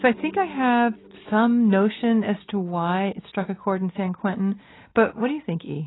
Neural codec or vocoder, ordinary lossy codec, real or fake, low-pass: none; AAC, 16 kbps; real; 7.2 kHz